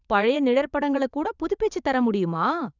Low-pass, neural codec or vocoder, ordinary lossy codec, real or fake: 7.2 kHz; vocoder, 22.05 kHz, 80 mel bands, WaveNeXt; none; fake